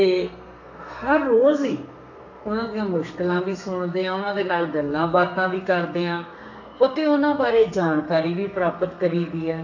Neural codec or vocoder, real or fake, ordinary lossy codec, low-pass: codec, 32 kHz, 1.9 kbps, SNAC; fake; AAC, 48 kbps; 7.2 kHz